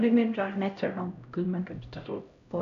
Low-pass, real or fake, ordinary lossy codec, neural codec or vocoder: 7.2 kHz; fake; none; codec, 16 kHz, 0.5 kbps, X-Codec, HuBERT features, trained on LibriSpeech